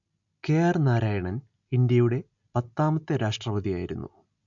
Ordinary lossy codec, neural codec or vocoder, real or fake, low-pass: MP3, 64 kbps; none; real; 7.2 kHz